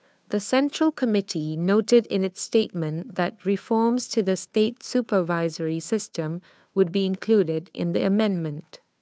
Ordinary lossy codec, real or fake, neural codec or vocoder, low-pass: none; fake; codec, 16 kHz, 2 kbps, FunCodec, trained on Chinese and English, 25 frames a second; none